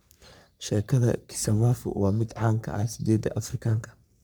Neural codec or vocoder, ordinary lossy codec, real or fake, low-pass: codec, 44.1 kHz, 3.4 kbps, Pupu-Codec; none; fake; none